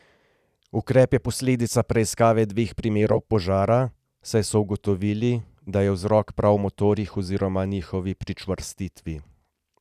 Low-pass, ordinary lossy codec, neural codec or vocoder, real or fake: 14.4 kHz; none; none; real